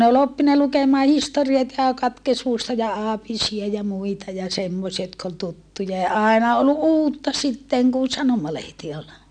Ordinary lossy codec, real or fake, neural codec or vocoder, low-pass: none; real; none; 9.9 kHz